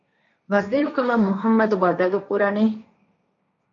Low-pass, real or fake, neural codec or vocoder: 7.2 kHz; fake; codec, 16 kHz, 1.1 kbps, Voila-Tokenizer